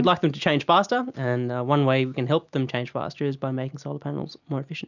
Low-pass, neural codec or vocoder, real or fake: 7.2 kHz; none; real